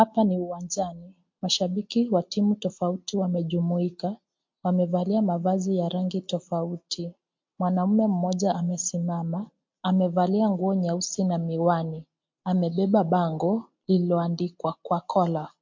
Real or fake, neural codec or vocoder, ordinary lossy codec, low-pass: real; none; MP3, 48 kbps; 7.2 kHz